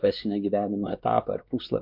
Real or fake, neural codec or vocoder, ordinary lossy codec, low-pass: fake; codec, 16 kHz, 2 kbps, X-Codec, WavLM features, trained on Multilingual LibriSpeech; MP3, 48 kbps; 5.4 kHz